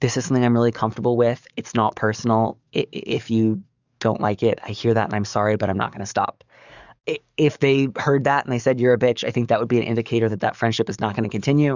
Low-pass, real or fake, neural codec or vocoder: 7.2 kHz; fake; codec, 44.1 kHz, 7.8 kbps, DAC